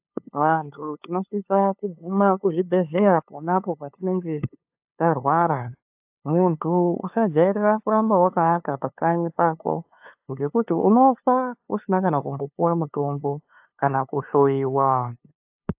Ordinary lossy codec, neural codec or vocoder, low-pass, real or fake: AAC, 32 kbps; codec, 16 kHz, 2 kbps, FunCodec, trained on LibriTTS, 25 frames a second; 3.6 kHz; fake